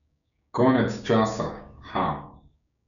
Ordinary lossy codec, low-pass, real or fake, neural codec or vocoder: MP3, 96 kbps; 7.2 kHz; fake; codec, 16 kHz, 6 kbps, DAC